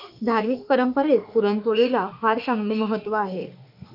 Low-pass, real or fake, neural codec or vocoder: 5.4 kHz; fake; autoencoder, 48 kHz, 32 numbers a frame, DAC-VAE, trained on Japanese speech